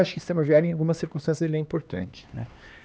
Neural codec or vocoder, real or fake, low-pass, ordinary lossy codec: codec, 16 kHz, 2 kbps, X-Codec, HuBERT features, trained on LibriSpeech; fake; none; none